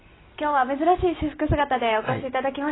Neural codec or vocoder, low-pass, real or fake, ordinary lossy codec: none; 7.2 kHz; real; AAC, 16 kbps